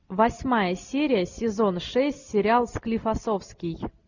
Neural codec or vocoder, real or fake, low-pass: none; real; 7.2 kHz